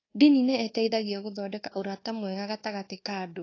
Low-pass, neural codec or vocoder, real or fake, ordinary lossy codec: 7.2 kHz; codec, 24 kHz, 1.2 kbps, DualCodec; fake; AAC, 32 kbps